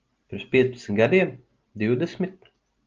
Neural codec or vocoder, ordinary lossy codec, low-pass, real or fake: none; Opus, 24 kbps; 7.2 kHz; real